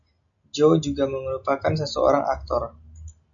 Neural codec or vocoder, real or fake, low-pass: none; real; 7.2 kHz